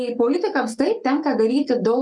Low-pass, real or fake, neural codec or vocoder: 10.8 kHz; fake; codec, 44.1 kHz, 7.8 kbps, Pupu-Codec